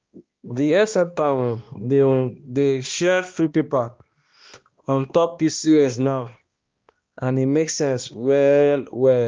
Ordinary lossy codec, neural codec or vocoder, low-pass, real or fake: Opus, 24 kbps; codec, 16 kHz, 1 kbps, X-Codec, HuBERT features, trained on balanced general audio; 7.2 kHz; fake